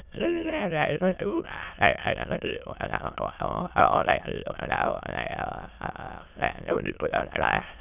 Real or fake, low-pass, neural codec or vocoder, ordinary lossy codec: fake; 3.6 kHz; autoencoder, 22.05 kHz, a latent of 192 numbers a frame, VITS, trained on many speakers; none